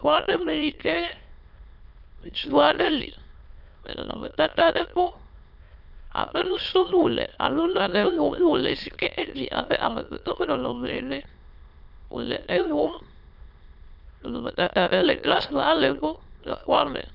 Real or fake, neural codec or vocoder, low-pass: fake; autoencoder, 22.05 kHz, a latent of 192 numbers a frame, VITS, trained on many speakers; 5.4 kHz